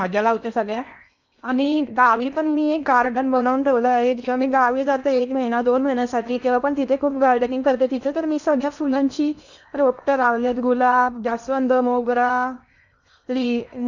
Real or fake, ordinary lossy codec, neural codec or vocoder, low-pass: fake; none; codec, 16 kHz in and 24 kHz out, 0.8 kbps, FocalCodec, streaming, 65536 codes; 7.2 kHz